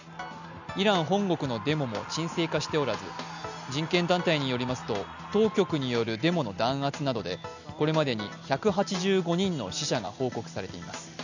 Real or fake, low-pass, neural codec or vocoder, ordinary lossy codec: real; 7.2 kHz; none; none